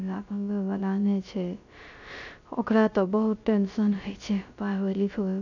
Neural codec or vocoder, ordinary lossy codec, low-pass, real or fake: codec, 16 kHz, 0.3 kbps, FocalCodec; none; 7.2 kHz; fake